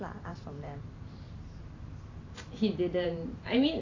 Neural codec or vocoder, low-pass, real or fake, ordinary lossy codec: vocoder, 44.1 kHz, 128 mel bands every 512 samples, BigVGAN v2; 7.2 kHz; fake; AAC, 32 kbps